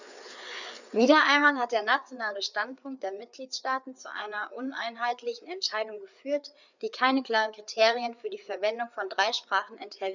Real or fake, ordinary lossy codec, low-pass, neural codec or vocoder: fake; none; 7.2 kHz; codec, 16 kHz, 4 kbps, FreqCodec, larger model